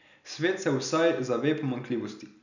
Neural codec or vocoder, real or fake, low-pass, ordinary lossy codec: none; real; 7.2 kHz; none